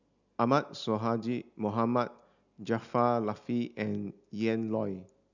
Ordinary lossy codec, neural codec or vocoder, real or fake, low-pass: none; none; real; 7.2 kHz